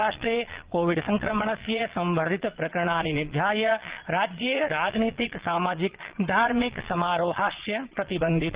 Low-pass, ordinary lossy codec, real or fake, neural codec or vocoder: 3.6 kHz; Opus, 16 kbps; fake; vocoder, 22.05 kHz, 80 mel bands, Vocos